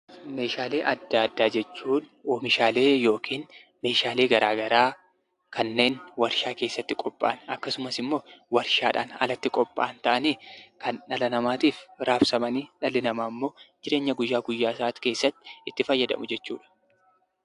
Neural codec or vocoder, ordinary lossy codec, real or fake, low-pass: none; AAC, 64 kbps; real; 10.8 kHz